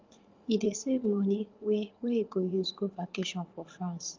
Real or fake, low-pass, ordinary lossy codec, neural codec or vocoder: fake; 7.2 kHz; Opus, 32 kbps; vocoder, 44.1 kHz, 80 mel bands, Vocos